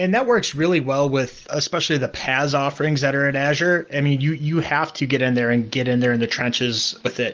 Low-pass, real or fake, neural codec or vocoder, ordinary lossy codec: 7.2 kHz; real; none; Opus, 24 kbps